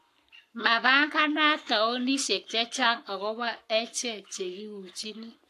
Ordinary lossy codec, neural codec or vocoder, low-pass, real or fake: AAC, 64 kbps; codec, 44.1 kHz, 7.8 kbps, Pupu-Codec; 14.4 kHz; fake